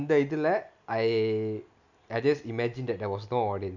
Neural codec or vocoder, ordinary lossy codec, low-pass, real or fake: none; none; 7.2 kHz; real